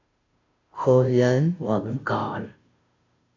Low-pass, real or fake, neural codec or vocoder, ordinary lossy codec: 7.2 kHz; fake; codec, 16 kHz, 0.5 kbps, FunCodec, trained on Chinese and English, 25 frames a second; AAC, 32 kbps